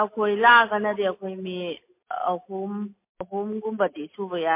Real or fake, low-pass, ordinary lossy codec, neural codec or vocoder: real; 3.6 kHz; MP3, 24 kbps; none